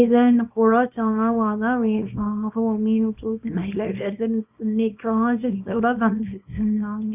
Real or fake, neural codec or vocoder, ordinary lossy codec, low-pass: fake; codec, 24 kHz, 0.9 kbps, WavTokenizer, small release; none; 3.6 kHz